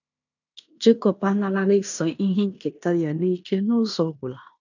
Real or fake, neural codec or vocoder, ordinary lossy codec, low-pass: fake; codec, 16 kHz in and 24 kHz out, 0.9 kbps, LongCat-Audio-Codec, fine tuned four codebook decoder; none; 7.2 kHz